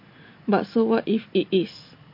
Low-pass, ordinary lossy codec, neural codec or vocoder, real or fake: 5.4 kHz; MP3, 32 kbps; none; real